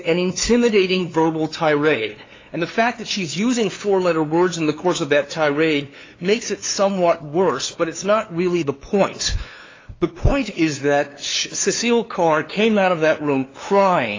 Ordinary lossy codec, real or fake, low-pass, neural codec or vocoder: AAC, 32 kbps; fake; 7.2 kHz; codec, 16 kHz, 2 kbps, FunCodec, trained on LibriTTS, 25 frames a second